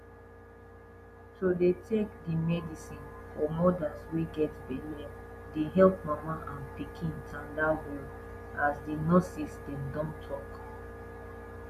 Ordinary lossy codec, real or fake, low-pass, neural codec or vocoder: none; real; 14.4 kHz; none